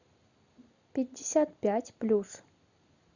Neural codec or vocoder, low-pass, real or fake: none; 7.2 kHz; real